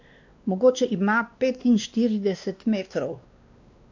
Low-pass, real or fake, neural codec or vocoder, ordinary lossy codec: 7.2 kHz; fake; codec, 16 kHz, 2 kbps, X-Codec, WavLM features, trained on Multilingual LibriSpeech; AAC, 48 kbps